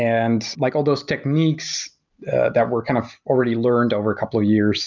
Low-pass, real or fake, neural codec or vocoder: 7.2 kHz; real; none